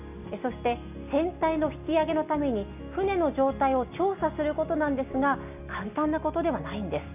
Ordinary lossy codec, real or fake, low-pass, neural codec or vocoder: none; real; 3.6 kHz; none